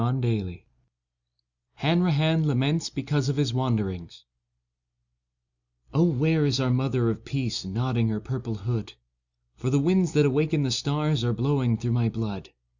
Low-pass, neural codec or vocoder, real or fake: 7.2 kHz; none; real